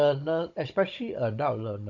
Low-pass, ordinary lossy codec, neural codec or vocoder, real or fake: 7.2 kHz; Opus, 64 kbps; codec, 16 kHz, 16 kbps, FunCodec, trained on Chinese and English, 50 frames a second; fake